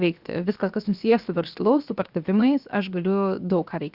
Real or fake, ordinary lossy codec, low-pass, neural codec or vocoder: fake; Opus, 64 kbps; 5.4 kHz; codec, 16 kHz, 0.7 kbps, FocalCodec